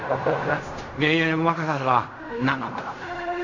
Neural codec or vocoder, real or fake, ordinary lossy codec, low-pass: codec, 16 kHz in and 24 kHz out, 0.4 kbps, LongCat-Audio-Codec, fine tuned four codebook decoder; fake; MP3, 48 kbps; 7.2 kHz